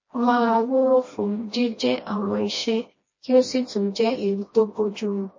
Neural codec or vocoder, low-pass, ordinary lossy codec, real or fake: codec, 16 kHz, 1 kbps, FreqCodec, smaller model; 7.2 kHz; MP3, 32 kbps; fake